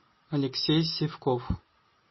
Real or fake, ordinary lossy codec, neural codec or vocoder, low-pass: real; MP3, 24 kbps; none; 7.2 kHz